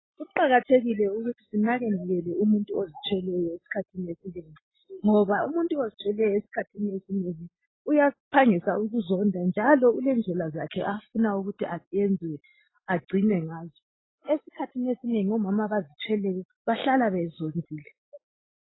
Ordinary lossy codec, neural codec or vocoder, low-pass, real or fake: AAC, 16 kbps; none; 7.2 kHz; real